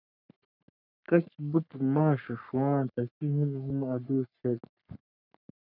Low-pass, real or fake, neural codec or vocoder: 5.4 kHz; fake; codec, 44.1 kHz, 3.4 kbps, Pupu-Codec